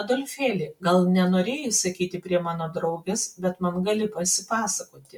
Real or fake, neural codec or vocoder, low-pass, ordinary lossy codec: real; none; 19.8 kHz; MP3, 96 kbps